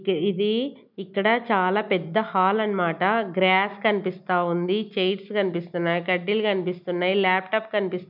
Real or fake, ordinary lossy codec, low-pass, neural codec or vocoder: real; none; 5.4 kHz; none